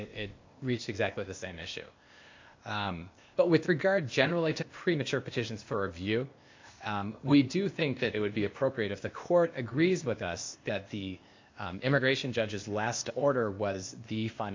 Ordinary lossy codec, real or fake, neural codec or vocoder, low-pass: MP3, 64 kbps; fake; codec, 16 kHz, 0.8 kbps, ZipCodec; 7.2 kHz